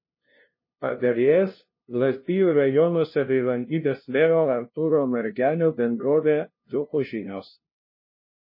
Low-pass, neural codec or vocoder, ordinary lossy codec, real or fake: 5.4 kHz; codec, 16 kHz, 0.5 kbps, FunCodec, trained on LibriTTS, 25 frames a second; MP3, 24 kbps; fake